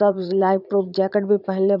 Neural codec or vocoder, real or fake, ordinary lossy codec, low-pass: vocoder, 44.1 kHz, 80 mel bands, Vocos; fake; none; 5.4 kHz